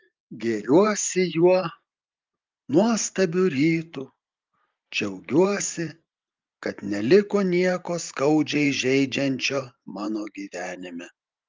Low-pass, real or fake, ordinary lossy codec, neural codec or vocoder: 7.2 kHz; fake; Opus, 24 kbps; vocoder, 44.1 kHz, 128 mel bands every 512 samples, BigVGAN v2